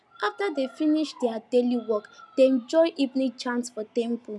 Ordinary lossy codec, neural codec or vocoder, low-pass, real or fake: none; none; none; real